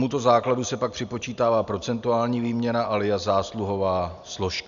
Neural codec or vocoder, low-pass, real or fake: none; 7.2 kHz; real